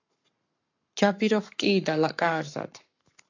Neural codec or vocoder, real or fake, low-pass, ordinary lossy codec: codec, 44.1 kHz, 7.8 kbps, Pupu-Codec; fake; 7.2 kHz; AAC, 48 kbps